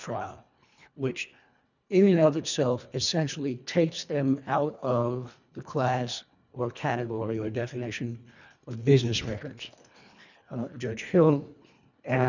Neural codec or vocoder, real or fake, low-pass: codec, 24 kHz, 1.5 kbps, HILCodec; fake; 7.2 kHz